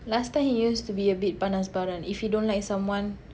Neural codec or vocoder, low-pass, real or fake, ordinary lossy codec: none; none; real; none